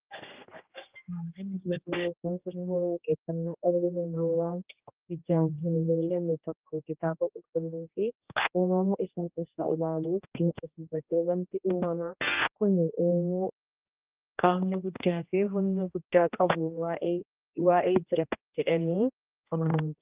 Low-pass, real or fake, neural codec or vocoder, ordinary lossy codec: 3.6 kHz; fake; codec, 16 kHz, 1 kbps, X-Codec, HuBERT features, trained on general audio; Opus, 32 kbps